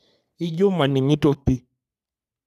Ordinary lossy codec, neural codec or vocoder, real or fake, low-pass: none; codec, 44.1 kHz, 3.4 kbps, Pupu-Codec; fake; 14.4 kHz